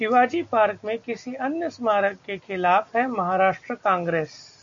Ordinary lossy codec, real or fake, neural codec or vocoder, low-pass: MP3, 64 kbps; real; none; 7.2 kHz